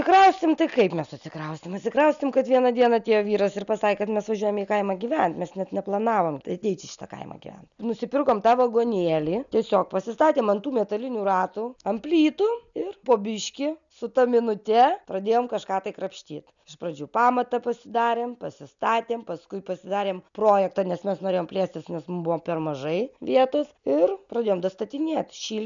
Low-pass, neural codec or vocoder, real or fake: 7.2 kHz; none; real